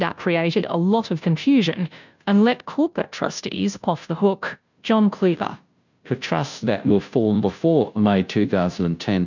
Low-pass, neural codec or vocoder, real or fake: 7.2 kHz; codec, 16 kHz, 0.5 kbps, FunCodec, trained on Chinese and English, 25 frames a second; fake